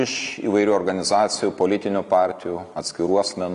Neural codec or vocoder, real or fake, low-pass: none; real; 10.8 kHz